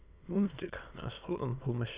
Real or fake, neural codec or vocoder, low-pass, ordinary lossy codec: fake; autoencoder, 22.05 kHz, a latent of 192 numbers a frame, VITS, trained on many speakers; 3.6 kHz; Opus, 64 kbps